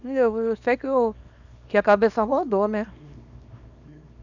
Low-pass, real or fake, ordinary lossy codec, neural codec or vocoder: 7.2 kHz; fake; none; codec, 24 kHz, 0.9 kbps, WavTokenizer, small release